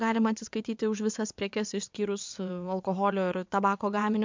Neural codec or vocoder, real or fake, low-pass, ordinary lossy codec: vocoder, 22.05 kHz, 80 mel bands, WaveNeXt; fake; 7.2 kHz; MP3, 64 kbps